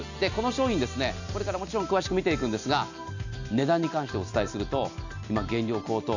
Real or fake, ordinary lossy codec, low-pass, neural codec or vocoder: real; none; 7.2 kHz; none